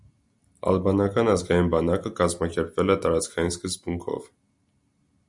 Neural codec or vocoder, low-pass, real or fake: none; 10.8 kHz; real